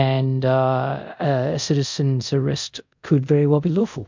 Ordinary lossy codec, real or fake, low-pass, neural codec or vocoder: MP3, 64 kbps; fake; 7.2 kHz; codec, 24 kHz, 0.5 kbps, DualCodec